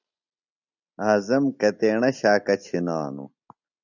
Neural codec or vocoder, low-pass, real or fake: none; 7.2 kHz; real